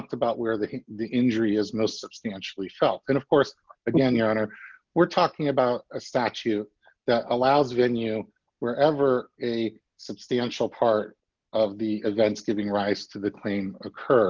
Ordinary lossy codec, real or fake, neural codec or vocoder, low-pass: Opus, 32 kbps; real; none; 7.2 kHz